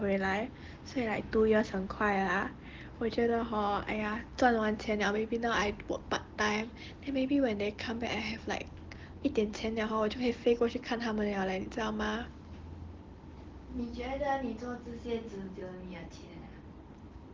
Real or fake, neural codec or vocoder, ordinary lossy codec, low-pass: real; none; Opus, 16 kbps; 7.2 kHz